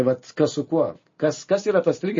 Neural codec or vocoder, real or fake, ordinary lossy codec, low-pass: none; real; MP3, 32 kbps; 7.2 kHz